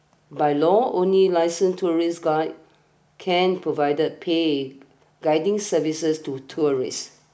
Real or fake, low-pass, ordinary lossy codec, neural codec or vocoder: real; none; none; none